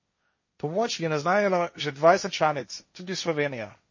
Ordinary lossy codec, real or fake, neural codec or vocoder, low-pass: MP3, 32 kbps; fake; codec, 16 kHz, 1.1 kbps, Voila-Tokenizer; 7.2 kHz